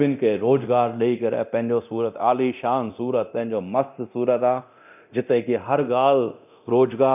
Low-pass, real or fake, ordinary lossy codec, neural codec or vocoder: 3.6 kHz; fake; none; codec, 24 kHz, 0.9 kbps, DualCodec